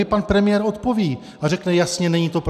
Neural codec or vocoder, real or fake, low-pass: none; real; 14.4 kHz